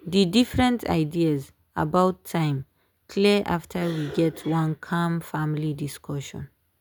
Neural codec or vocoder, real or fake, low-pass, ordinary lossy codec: none; real; none; none